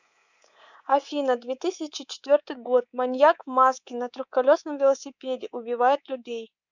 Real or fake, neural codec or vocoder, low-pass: fake; codec, 24 kHz, 3.1 kbps, DualCodec; 7.2 kHz